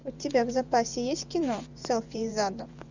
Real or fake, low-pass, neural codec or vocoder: fake; 7.2 kHz; vocoder, 24 kHz, 100 mel bands, Vocos